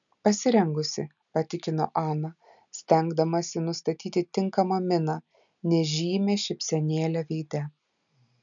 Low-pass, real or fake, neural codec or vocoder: 7.2 kHz; real; none